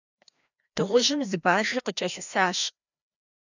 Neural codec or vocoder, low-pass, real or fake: codec, 16 kHz, 1 kbps, FreqCodec, larger model; 7.2 kHz; fake